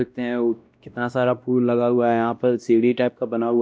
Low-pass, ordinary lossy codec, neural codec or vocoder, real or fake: none; none; codec, 16 kHz, 1 kbps, X-Codec, WavLM features, trained on Multilingual LibriSpeech; fake